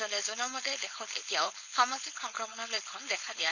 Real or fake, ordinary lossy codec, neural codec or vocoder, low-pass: fake; none; codec, 16 kHz, 4.8 kbps, FACodec; 7.2 kHz